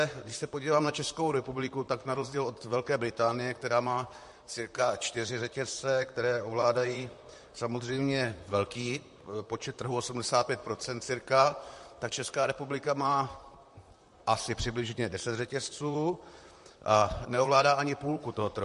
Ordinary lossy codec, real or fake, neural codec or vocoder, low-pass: MP3, 48 kbps; fake; vocoder, 44.1 kHz, 128 mel bands, Pupu-Vocoder; 14.4 kHz